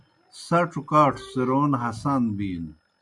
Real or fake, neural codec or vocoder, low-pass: real; none; 10.8 kHz